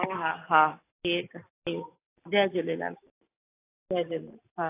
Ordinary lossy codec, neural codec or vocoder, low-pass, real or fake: AAC, 24 kbps; none; 3.6 kHz; real